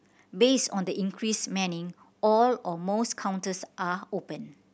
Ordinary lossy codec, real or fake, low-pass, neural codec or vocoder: none; real; none; none